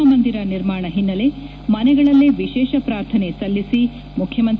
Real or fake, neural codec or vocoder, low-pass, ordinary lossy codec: real; none; none; none